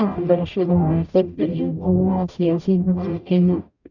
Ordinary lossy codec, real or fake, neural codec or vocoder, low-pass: none; fake; codec, 44.1 kHz, 0.9 kbps, DAC; 7.2 kHz